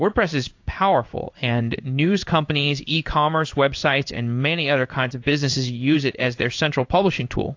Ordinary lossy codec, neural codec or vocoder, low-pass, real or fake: AAC, 48 kbps; codec, 16 kHz in and 24 kHz out, 1 kbps, XY-Tokenizer; 7.2 kHz; fake